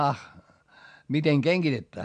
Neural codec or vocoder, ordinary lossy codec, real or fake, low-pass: none; MP3, 64 kbps; real; 9.9 kHz